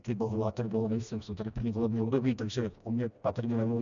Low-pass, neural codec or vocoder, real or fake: 7.2 kHz; codec, 16 kHz, 1 kbps, FreqCodec, smaller model; fake